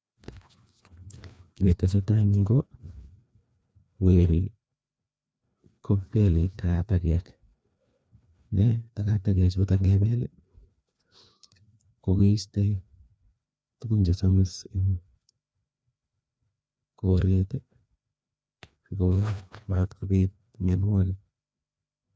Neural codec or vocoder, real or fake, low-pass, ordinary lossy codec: codec, 16 kHz, 2 kbps, FreqCodec, larger model; fake; none; none